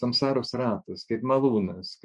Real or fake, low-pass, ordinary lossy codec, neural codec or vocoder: fake; 10.8 kHz; MP3, 64 kbps; autoencoder, 48 kHz, 128 numbers a frame, DAC-VAE, trained on Japanese speech